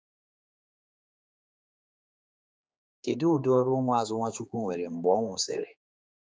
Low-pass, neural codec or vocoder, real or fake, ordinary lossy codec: none; codec, 16 kHz, 4 kbps, X-Codec, HuBERT features, trained on general audio; fake; none